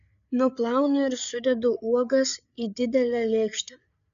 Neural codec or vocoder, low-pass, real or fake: codec, 16 kHz, 8 kbps, FreqCodec, larger model; 7.2 kHz; fake